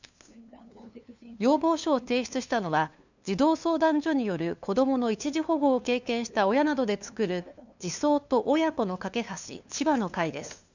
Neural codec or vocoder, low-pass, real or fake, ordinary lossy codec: codec, 16 kHz, 2 kbps, FunCodec, trained on LibriTTS, 25 frames a second; 7.2 kHz; fake; none